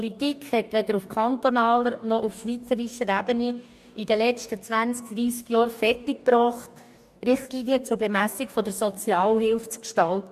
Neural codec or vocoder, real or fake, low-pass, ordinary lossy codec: codec, 44.1 kHz, 2.6 kbps, DAC; fake; 14.4 kHz; AAC, 96 kbps